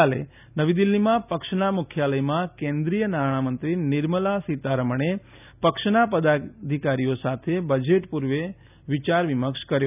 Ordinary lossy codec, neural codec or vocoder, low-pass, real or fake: none; none; 3.6 kHz; real